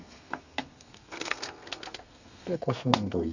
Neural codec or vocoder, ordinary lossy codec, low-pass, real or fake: codec, 32 kHz, 1.9 kbps, SNAC; none; 7.2 kHz; fake